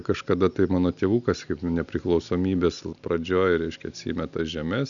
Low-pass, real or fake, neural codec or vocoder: 7.2 kHz; real; none